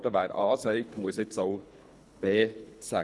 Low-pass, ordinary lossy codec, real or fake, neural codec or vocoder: none; none; fake; codec, 24 kHz, 3 kbps, HILCodec